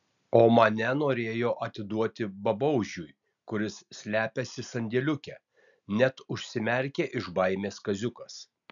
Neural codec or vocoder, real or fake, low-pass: none; real; 7.2 kHz